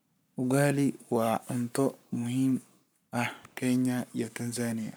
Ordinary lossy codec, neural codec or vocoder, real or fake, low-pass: none; codec, 44.1 kHz, 7.8 kbps, Pupu-Codec; fake; none